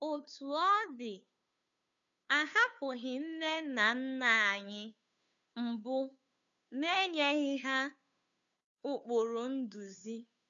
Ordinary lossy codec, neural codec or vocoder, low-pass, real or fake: none; codec, 16 kHz, 2 kbps, FunCodec, trained on Chinese and English, 25 frames a second; 7.2 kHz; fake